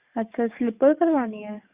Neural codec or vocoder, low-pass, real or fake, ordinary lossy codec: codec, 44.1 kHz, 7.8 kbps, DAC; 3.6 kHz; fake; Opus, 64 kbps